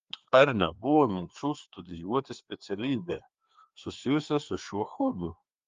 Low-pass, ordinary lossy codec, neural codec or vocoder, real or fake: 7.2 kHz; Opus, 32 kbps; codec, 16 kHz, 2 kbps, FreqCodec, larger model; fake